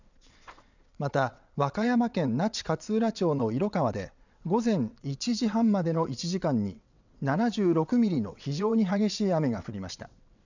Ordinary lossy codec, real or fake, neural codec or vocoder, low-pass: none; fake; vocoder, 22.05 kHz, 80 mel bands, WaveNeXt; 7.2 kHz